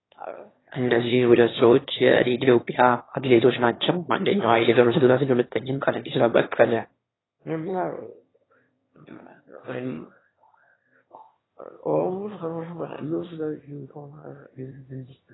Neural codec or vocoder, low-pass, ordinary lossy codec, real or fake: autoencoder, 22.05 kHz, a latent of 192 numbers a frame, VITS, trained on one speaker; 7.2 kHz; AAC, 16 kbps; fake